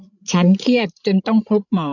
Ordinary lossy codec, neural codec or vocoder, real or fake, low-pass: none; codec, 16 kHz, 8 kbps, FreqCodec, larger model; fake; 7.2 kHz